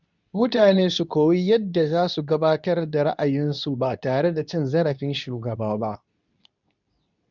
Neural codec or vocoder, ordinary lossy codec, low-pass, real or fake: codec, 24 kHz, 0.9 kbps, WavTokenizer, medium speech release version 2; none; 7.2 kHz; fake